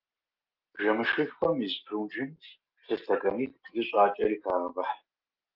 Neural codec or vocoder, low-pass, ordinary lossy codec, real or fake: none; 5.4 kHz; Opus, 32 kbps; real